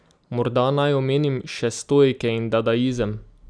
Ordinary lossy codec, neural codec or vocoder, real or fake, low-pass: none; none; real; 9.9 kHz